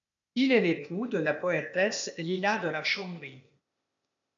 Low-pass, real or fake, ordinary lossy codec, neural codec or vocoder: 7.2 kHz; fake; MP3, 96 kbps; codec, 16 kHz, 0.8 kbps, ZipCodec